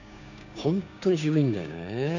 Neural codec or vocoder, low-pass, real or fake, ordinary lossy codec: codec, 16 kHz, 6 kbps, DAC; 7.2 kHz; fake; none